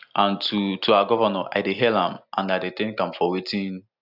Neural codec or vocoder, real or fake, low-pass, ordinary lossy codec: none; real; 5.4 kHz; none